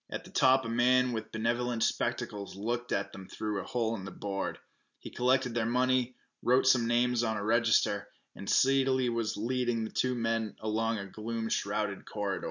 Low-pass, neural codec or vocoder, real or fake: 7.2 kHz; none; real